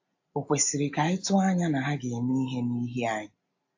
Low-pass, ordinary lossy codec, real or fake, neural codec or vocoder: 7.2 kHz; AAC, 48 kbps; real; none